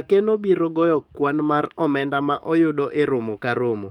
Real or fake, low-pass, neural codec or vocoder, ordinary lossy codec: fake; 19.8 kHz; codec, 44.1 kHz, 7.8 kbps, DAC; none